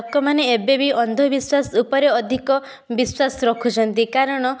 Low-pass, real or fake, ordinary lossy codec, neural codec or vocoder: none; real; none; none